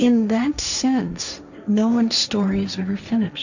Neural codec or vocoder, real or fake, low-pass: codec, 16 kHz, 1.1 kbps, Voila-Tokenizer; fake; 7.2 kHz